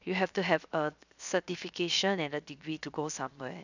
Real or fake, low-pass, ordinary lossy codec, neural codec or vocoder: fake; 7.2 kHz; none; codec, 16 kHz, 0.7 kbps, FocalCodec